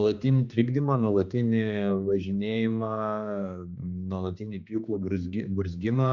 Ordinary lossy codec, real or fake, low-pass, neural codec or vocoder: Opus, 64 kbps; fake; 7.2 kHz; codec, 16 kHz, 2 kbps, X-Codec, HuBERT features, trained on general audio